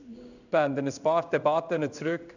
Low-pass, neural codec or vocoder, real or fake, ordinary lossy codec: 7.2 kHz; codec, 16 kHz in and 24 kHz out, 1 kbps, XY-Tokenizer; fake; none